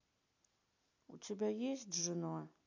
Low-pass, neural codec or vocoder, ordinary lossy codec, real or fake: 7.2 kHz; none; AAC, 48 kbps; real